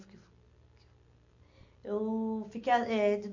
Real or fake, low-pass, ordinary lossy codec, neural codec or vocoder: real; 7.2 kHz; AAC, 48 kbps; none